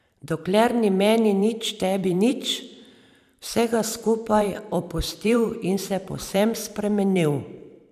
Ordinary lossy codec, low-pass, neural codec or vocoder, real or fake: none; 14.4 kHz; vocoder, 44.1 kHz, 128 mel bands every 512 samples, BigVGAN v2; fake